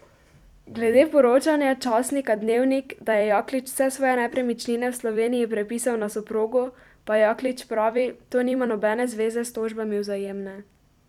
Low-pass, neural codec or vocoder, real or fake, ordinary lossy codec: 19.8 kHz; vocoder, 44.1 kHz, 128 mel bands every 256 samples, BigVGAN v2; fake; none